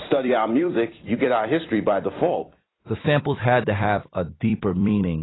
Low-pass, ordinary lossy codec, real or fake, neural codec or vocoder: 7.2 kHz; AAC, 16 kbps; fake; vocoder, 44.1 kHz, 128 mel bands every 256 samples, BigVGAN v2